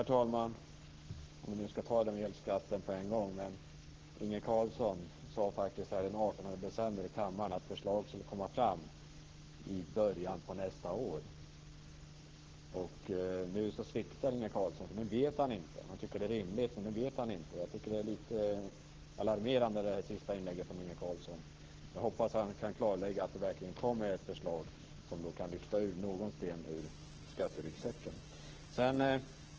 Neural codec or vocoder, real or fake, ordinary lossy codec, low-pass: codec, 44.1 kHz, 7.8 kbps, Pupu-Codec; fake; Opus, 16 kbps; 7.2 kHz